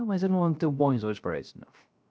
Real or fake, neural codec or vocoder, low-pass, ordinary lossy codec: fake; codec, 16 kHz, 0.3 kbps, FocalCodec; none; none